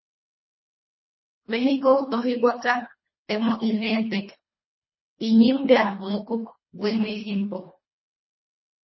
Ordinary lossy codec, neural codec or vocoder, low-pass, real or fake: MP3, 24 kbps; codec, 24 kHz, 1.5 kbps, HILCodec; 7.2 kHz; fake